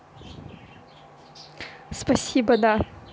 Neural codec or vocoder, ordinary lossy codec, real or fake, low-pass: none; none; real; none